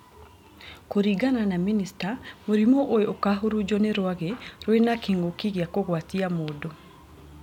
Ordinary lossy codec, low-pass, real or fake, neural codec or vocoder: none; 19.8 kHz; real; none